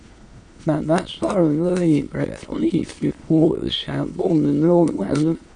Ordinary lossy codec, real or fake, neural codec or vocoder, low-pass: AAC, 64 kbps; fake; autoencoder, 22.05 kHz, a latent of 192 numbers a frame, VITS, trained on many speakers; 9.9 kHz